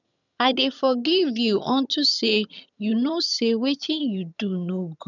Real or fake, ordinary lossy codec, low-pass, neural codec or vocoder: fake; none; 7.2 kHz; vocoder, 22.05 kHz, 80 mel bands, HiFi-GAN